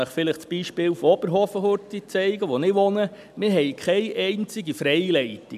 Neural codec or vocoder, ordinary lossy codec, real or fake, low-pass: none; none; real; 14.4 kHz